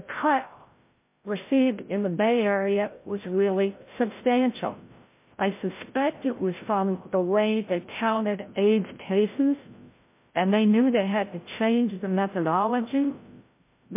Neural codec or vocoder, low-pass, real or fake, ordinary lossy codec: codec, 16 kHz, 0.5 kbps, FreqCodec, larger model; 3.6 kHz; fake; MP3, 24 kbps